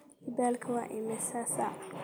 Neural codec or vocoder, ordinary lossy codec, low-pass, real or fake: none; none; none; real